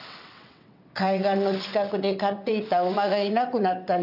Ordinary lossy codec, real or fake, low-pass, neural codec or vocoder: none; fake; 5.4 kHz; vocoder, 22.05 kHz, 80 mel bands, WaveNeXt